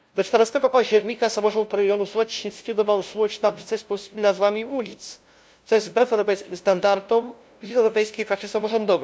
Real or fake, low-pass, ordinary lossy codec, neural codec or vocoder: fake; none; none; codec, 16 kHz, 0.5 kbps, FunCodec, trained on LibriTTS, 25 frames a second